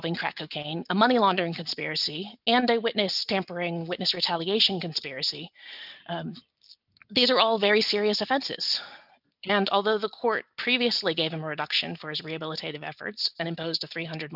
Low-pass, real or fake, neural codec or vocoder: 5.4 kHz; real; none